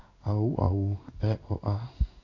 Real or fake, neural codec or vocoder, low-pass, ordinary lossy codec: fake; codec, 16 kHz in and 24 kHz out, 1 kbps, XY-Tokenizer; 7.2 kHz; none